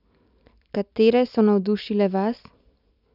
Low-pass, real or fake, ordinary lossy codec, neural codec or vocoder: 5.4 kHz; real; none; none